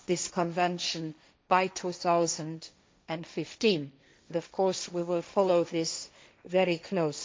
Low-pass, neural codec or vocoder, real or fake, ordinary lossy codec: none; codec, 16 kHz, 1.1 kbps, Voila-Tokenizer; fake; none